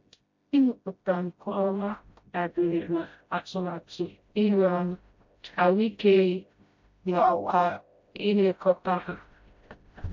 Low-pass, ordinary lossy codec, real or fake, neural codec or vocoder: 7.2 kHz; MP3, 48 kbps; fake; codec, 16 kHz, 0.5 kbps, FreqCodec, smaller model